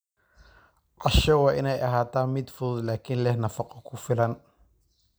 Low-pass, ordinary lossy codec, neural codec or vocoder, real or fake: none; none; none; real